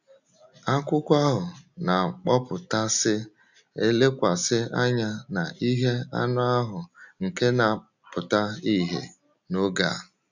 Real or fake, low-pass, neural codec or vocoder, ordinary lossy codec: real; 7.2 kHz; none; none